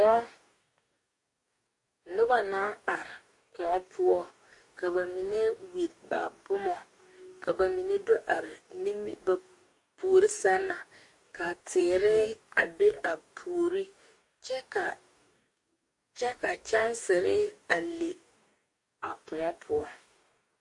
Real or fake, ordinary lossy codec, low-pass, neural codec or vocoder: fake; MP3, 48 kbps; 10.8 kHz; codec, 44.1 kHz, 2.6 kbps, DAC